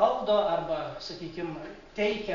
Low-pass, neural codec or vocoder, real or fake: 7.2 kHz; none; real